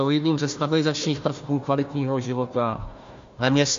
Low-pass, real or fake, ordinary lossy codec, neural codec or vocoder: 7.2 kHz; fake; MP3, 48 kbps; codec, 16 kHz, 1 kbps, FunCodec, trained on Chinese and English, 50 frames a second